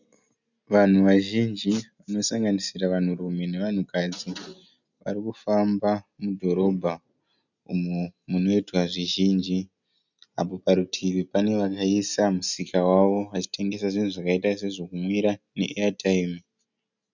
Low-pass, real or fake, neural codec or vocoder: 7.2 kHz; real; none